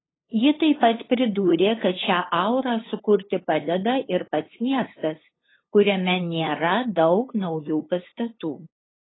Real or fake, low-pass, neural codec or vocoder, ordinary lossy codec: fake; 7.2 kHz; codec, 16 kHz, 8 kbps, FunCodec, trained on LibriTTS, 25 frames a second; AAC, 16 kbps